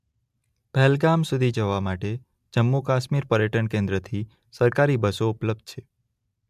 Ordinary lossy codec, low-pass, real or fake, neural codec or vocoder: MP3, 96 kbps; 14.4 kHz; real; none